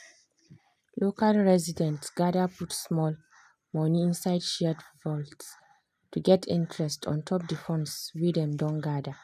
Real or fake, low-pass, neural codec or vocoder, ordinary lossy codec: real; 14.4 kHz; none; none